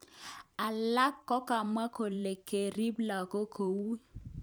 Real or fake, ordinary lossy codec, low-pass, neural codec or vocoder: fake; none; none; vocoder, 44.1 kHz, 128 mel bands every 512 samples, BigVGAN v2